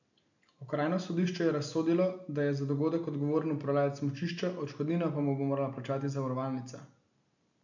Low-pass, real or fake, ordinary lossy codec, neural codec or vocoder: 7.2 kHz; real; none; none